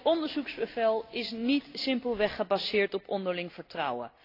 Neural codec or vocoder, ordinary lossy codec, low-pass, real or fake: none; AAC, 32 kbps; 5.4 kHz; real